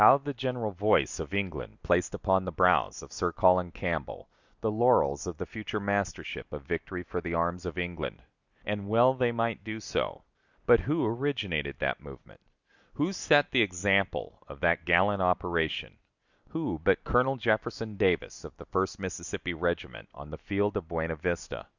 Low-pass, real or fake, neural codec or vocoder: 7.2 kHz; real; none